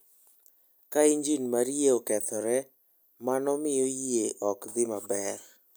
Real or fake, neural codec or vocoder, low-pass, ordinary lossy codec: real; none; none; none